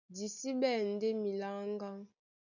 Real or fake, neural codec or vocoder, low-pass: real; none; 7.2 kHz